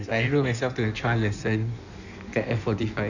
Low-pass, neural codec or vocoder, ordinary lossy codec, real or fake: 7.2 kHz; codec, 16 kHz in and 24 kHz out, 1.1 kbps, FireRedTTS-2 codec; none; fake